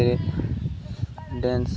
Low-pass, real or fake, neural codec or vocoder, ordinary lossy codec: none; real; none; none